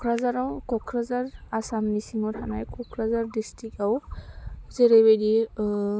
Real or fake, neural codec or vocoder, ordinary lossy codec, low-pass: real; none; none; none